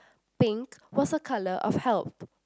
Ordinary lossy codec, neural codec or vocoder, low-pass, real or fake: none; none; none; real